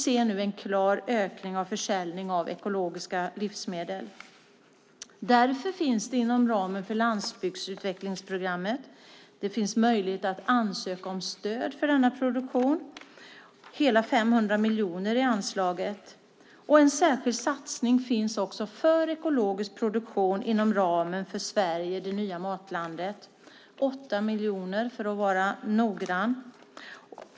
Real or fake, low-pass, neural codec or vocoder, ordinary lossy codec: real; none; none; none